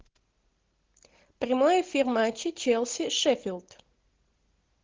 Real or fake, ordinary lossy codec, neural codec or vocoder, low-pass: real; Opus, 16 kbps; none; 7.2 kHz